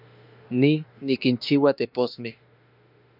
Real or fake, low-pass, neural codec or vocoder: fake; 5.4 kHz; autoencoder, 48 kHz, 32 numbers a frame, DAC-VAE, trained on Japanese speech